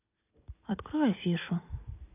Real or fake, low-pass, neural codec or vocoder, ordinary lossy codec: real; 3.6 kHz; none; none